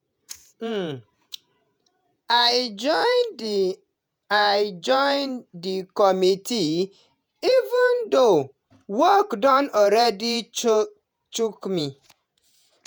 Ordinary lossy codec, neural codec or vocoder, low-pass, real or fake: none; vocoder, 48 kHz, 128 mel bands, Vocos; none; fake